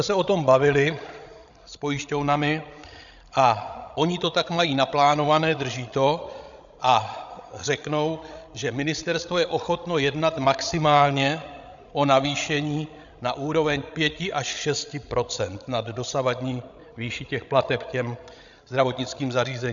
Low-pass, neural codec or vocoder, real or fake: 7.2 kHz; codec, 16 kHz, 16 kbps, FreqCodec, larger model; fake